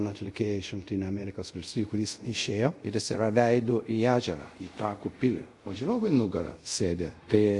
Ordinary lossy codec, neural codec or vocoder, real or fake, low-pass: MP3, 48 kbps; codec, 24 kHz, 0.5 kbps, DualCodec; fake; 10.8 kHz